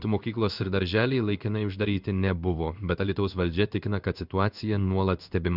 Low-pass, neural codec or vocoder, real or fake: 5.4 kHz; codec, 16 kHz in and 24 kHz out, 1 kbps, XY-Tokenizer; fake